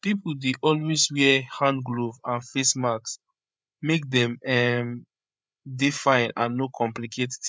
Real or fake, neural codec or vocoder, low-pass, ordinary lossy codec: fake; codec, 16 kHz, 8 kbps, FreqCodec, larger model; none; none